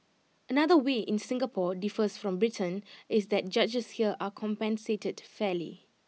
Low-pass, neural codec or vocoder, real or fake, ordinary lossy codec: none; none; real; none